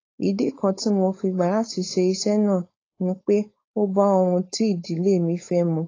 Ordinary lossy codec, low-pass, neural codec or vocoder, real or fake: AAC, 32 kbps; 7.2 kHz; codec, 16 kHz, 4.8 kbps, FACodec; fake